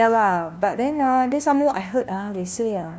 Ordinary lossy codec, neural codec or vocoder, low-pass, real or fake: none; codec, 16 kHz, 1 kbps, FunCodec, trained on LibriTTS, 50 frames a second; none; fake